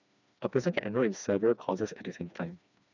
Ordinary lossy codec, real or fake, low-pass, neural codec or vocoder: none; fake; 7.2 kHz; codec, 16 kHz, 2 kbps, FreqCodec, smaller model